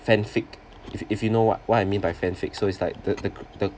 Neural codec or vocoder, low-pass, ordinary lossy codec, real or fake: none; none; none; real